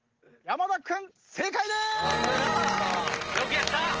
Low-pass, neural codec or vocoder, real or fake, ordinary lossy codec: 7.2 kHz; none; real; Opus, 16 kbps